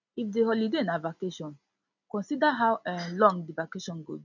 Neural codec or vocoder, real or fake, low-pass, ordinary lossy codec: none; real; 7.2 kHz; none